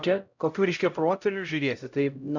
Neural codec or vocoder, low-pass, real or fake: codec, 16 kHz, 0.5 kbps, X-Codec, HuBERT features, trained on LibriSpeech; 7.2 kHz; fake